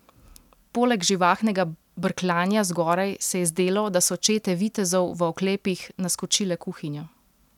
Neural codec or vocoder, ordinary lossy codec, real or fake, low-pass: none; none; real; 19.8 kHz